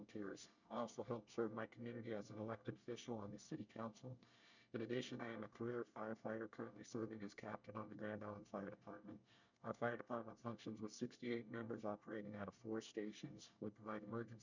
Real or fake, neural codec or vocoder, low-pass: fake; codec, 24 kHz, 1 kbps, SNAC; 7.2 kHz